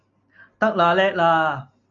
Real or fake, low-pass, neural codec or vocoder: real; 7.2 kHz; none